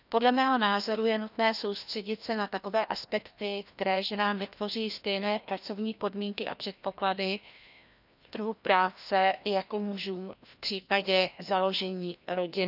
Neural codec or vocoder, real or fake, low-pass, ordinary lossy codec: codec, 16 kHz, 1 kbps, FreqCodec, larger model; fake; 5.4 kHz; none